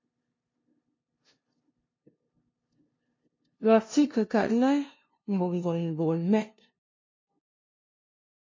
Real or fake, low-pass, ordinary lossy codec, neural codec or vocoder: fake; 7.2 kHz; MP3, 32 kbps; codec, 16 kHz, 0.5 kbps, FunCodec, trained on LibriTTS, 25 frames a second